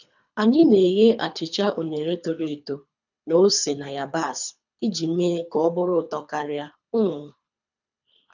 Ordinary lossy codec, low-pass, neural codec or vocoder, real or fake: none; 7.2 kHz; codec, 24 kHz, 3 kbps, HILCodec; fake